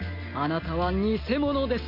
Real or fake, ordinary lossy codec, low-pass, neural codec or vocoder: real; MP3, 32 kbps; 5.4 kHz; none